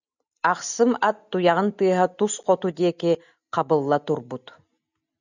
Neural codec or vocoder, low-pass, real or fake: none; 7.2 kHz; real